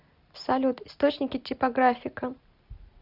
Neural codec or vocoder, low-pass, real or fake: none; 5.4 kHz; real